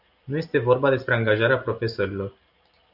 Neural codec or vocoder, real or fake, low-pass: vocoder, 44.1 kHz, 128 mel bands every 256 samples, BigVGAN v2; fake; 5.4 kHz